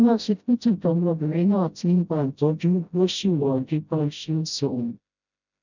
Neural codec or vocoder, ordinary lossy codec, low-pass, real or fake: codec, 16 kHz, 0.5 kbps, FreqCodec, smaller model; none; 7.2 kHz; fake